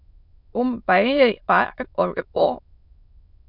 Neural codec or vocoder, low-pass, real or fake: autoencoder, 22.05 kHz, a latent of 192 numbers a frame, VITS, trained on many speakers; 5.4 kHz; fake